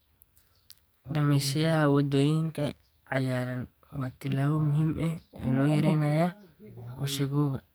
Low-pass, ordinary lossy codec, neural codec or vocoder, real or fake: none; none; codec, 44.1 kHz, 2.6 kbps, SNAC; fake